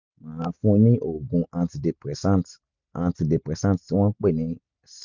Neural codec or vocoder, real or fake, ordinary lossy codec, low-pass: none; real; none; 7.2 kHz